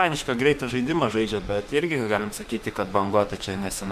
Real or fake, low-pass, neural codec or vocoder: fake; 14.4 kHz; autoencoder, 48 kHz, 32 numbers a frame, DAC-VAE, trained on Japanese speech